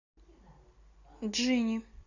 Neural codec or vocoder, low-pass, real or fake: none; 7.2 kHz; real